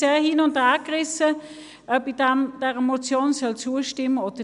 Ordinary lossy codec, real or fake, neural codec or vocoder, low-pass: none; real; none; 10.8 kHz